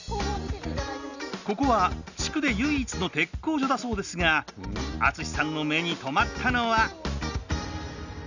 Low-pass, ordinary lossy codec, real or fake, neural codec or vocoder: 7.2 kHz; none; real; none